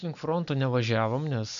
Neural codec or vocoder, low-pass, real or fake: none; 7.2 kHz; real